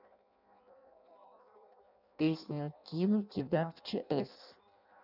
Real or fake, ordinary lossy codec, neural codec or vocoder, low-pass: fake; none; codec, 16 kHz in and 24 kHz out, 0.6 kbps, FireRedTTS-2 codec; 5.4 kHz